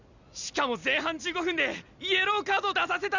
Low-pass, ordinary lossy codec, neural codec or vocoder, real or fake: 7.2 kHz; none; none; real